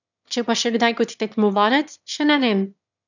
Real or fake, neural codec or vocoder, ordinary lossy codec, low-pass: fake; autoencoder, 22.05 kHz, a latent of 192 numbers a frame, VITS, trained on one speaker; none; 7.2 kHz